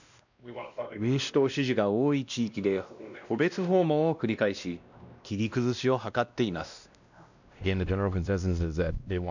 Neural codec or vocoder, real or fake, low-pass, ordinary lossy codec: codec, 16 kHz, 1 kbps, X-Codec, WavLM features, trained on Multilingual LibriSpeech; fake; 7.2 kHz; none